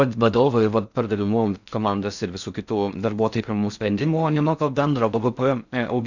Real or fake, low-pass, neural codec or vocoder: fake; 7.2 kHz; codec, 16 kHz in and 24 kHz out, 0.6 kbps, FocalCodec, streaming, 4096 codes